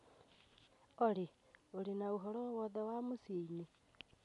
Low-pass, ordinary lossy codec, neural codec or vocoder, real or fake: 10.8 kHz; none; none; real